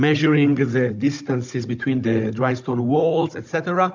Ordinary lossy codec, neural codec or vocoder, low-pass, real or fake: MP3, 64 kbps; codec, 16 kHz, 16 kbps, FunCodec, trained on Chinese and English, 50 frames a second; 7.2 kHz; fake